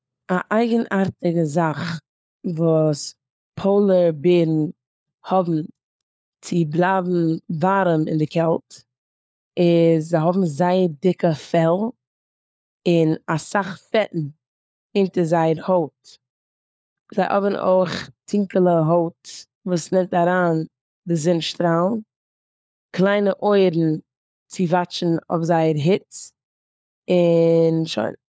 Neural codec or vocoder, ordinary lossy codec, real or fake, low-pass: codec, 16 kHz, 4 kbps, FunCodec, trained on LibriTTS, 50 frames a second; none; fake; none